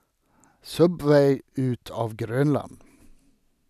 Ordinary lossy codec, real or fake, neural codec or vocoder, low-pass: none; real; none; 14.4 kHz